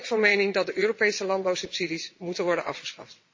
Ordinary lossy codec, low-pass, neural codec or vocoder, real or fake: MP3, 32 kbps; 7.2 kHz; vocoder, 22.05 kHz, 80 mel bands, WaveNeXt; fake